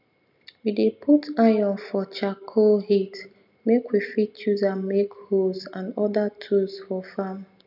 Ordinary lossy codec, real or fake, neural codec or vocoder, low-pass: none; real; none; 5.4 kHz